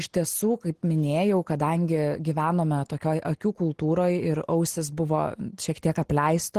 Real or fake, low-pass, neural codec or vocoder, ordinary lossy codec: real; 14.4 kHz; none; Opus, 16 kbps